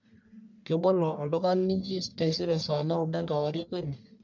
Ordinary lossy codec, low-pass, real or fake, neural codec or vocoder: none; 7.2 kHz; fake; codec, 44.1 kHz, 1.7 kbps, Pupu-Codec